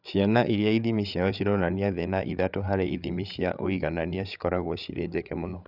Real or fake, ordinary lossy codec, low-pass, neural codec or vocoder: fake; none; 5.4 kHz; codec, 16 kHz, 8 kbps, FreqCodec, larger model